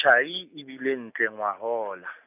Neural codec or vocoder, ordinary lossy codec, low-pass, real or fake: none; none; 3.6 kHz; real